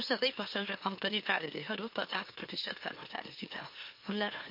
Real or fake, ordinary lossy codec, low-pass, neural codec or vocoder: fake; MP3, 32 kbps; 5.4 kHz; autoencoder, 44.1 kHz, a latent of 192 numbers a frame, MeloTTS